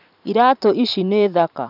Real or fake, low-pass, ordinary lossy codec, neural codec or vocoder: fake; 5.4 kHz; none; vocoder, 44.1 kHz, 128 mel bands every 512 samples, BigVGAN v2